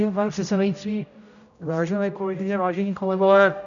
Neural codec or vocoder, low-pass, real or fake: codec, 16 kHz, 0.5 kbps, X-Codec, HuBERT features, trained on general audio; 7.2 kHz; fake